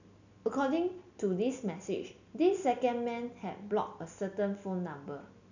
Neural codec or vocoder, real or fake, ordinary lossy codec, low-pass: none; real; none; 7.2 kHz